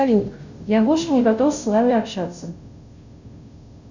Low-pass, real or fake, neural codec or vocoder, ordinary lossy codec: 7.2 kHz; fake; codec, 16 kHz, 0.5 kbps, FunCodec, trained on Chinese and English, 25 frames a second; Opus, 64 kbps